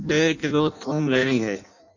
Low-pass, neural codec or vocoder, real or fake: 7.2 kHz; codec, 16 kHz in and 24 kHz out, 0.6 kbps, FireRedTTS-2 codec; fake